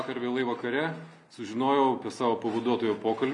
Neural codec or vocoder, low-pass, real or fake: none; 10.8 kHz; real